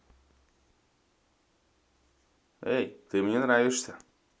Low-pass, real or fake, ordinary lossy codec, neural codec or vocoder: none; real; none; none